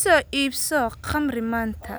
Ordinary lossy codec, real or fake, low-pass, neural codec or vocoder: none; real; none; none